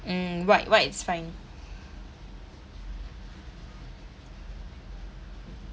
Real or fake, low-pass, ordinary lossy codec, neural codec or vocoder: real; none; none; none